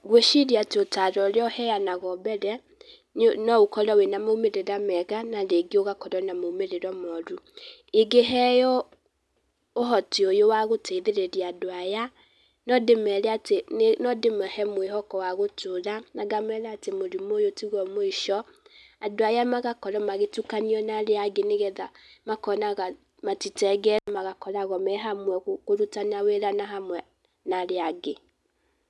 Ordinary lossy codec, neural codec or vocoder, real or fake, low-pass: none; none; real; none